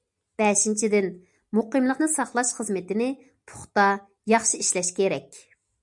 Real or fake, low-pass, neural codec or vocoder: real; 10.8 kHz; none